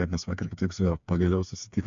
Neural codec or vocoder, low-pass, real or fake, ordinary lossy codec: codec, 16 kHz, 4 kbps, FreqCodec, smaller model; 7.2 kHz; fake; MP3, 64 kbps